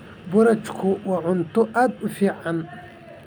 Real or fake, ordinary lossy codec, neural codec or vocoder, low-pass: real; none; none; none